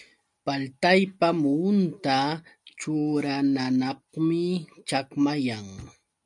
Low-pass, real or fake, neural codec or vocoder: 10.8 kHz; real; none